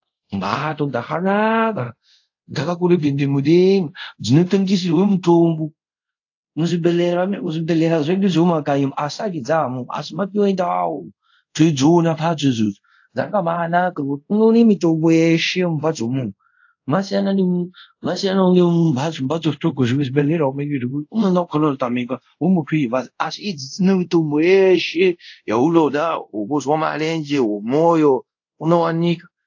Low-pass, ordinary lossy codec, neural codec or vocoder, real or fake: 7.2 kHz; AAC, 48 kbps; codec, 24 kHz, 0.5 kbps, DualCodec; fake